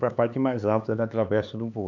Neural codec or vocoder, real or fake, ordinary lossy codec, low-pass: codec, 16 kHz, 4 kbps, X-Codec, HuBERT features, trained on LibriSpeech; fake; none; 7.2 kHz